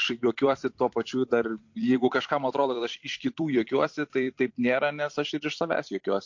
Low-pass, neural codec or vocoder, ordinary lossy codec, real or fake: 7.2 kHz; vocoder, 44.1 kHz, 128 mel bands every 256 samples, BigVGAN v2; MP3, 48 kbps; fake